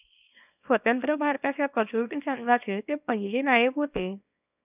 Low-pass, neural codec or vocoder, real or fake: 3.6 kHz; codec, 24 kHz, 0.9 kbps, WavTokenizer, small release; fake